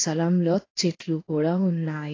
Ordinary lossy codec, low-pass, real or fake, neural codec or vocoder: AAC, 32 kbps; 7.2 kHz; fake; codec, 16 kHz in and 24 kHz out, 1 kbps, XY-Tokenizer